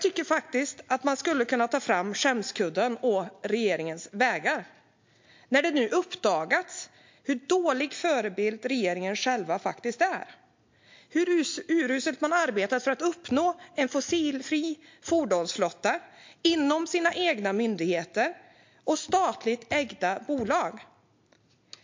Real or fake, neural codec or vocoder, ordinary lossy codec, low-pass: real; none; MP3, 48 kbps; 7.2 kHz